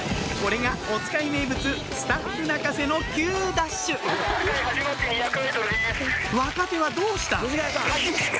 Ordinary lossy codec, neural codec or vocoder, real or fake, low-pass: none; none; real; none